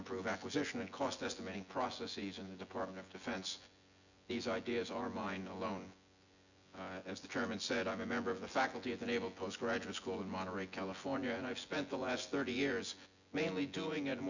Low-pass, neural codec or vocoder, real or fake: 7.2 kHz; vocoder, 24 kHz, 100 mel bands, Vocos; fake